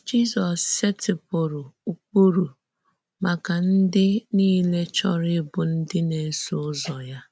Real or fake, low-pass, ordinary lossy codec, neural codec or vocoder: real; none; none; none